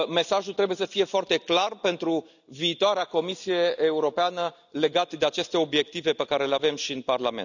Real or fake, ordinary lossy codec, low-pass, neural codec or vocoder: real; none; 7.2 kHz; none